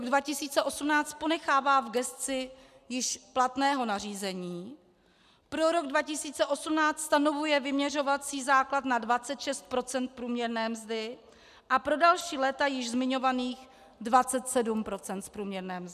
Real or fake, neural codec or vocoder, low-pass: real; none; 14.4 kHz